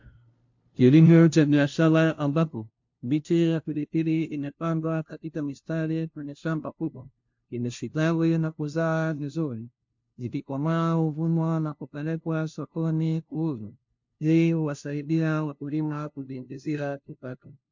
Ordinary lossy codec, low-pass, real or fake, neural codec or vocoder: MP3, 48 kbps; 7.2 kHz; fake; codec, 16 kHz, 0.5 kbps, FunCodec, trained on LibriTTS, 25 frames a second